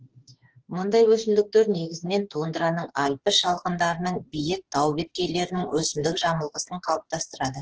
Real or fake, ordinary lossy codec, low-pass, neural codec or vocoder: fake; Opus, 16 kbps; 7.2 kHz; autoencoder, 48 kHz, 32 numbers a frame, DAC-VAE, trained on Japanese speech